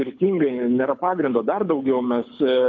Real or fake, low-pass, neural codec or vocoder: fake; 7.2 kHz; codec, 24 kHz, 6 kbps, HILCodec